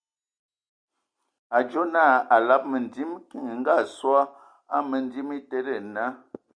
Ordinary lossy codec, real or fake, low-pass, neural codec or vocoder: Opus, 64 kbps; real; 9.9 kHz; none